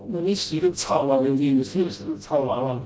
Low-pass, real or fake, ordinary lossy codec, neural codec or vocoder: none; fake; none; codec, 16 kHz, 0.5 kbps, FreqCodec, smaller model